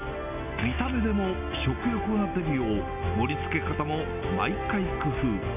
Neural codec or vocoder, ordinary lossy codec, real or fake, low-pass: none; none; real; 3.6 kHz